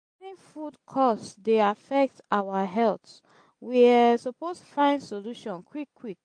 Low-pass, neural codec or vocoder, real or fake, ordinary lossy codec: 9.9 kHz; none; real; MP3, 48 kbps